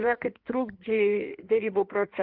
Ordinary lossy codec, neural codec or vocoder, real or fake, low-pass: Opus, 32 kbps; codec, 16 kHz in and 24 kHz out, 1.1 kbps, FireRedTTS-2 codec; fake; 5.4 kHz